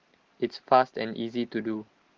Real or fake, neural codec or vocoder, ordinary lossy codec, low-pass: real; none; Opus, 16 kbps; 7.2 kHz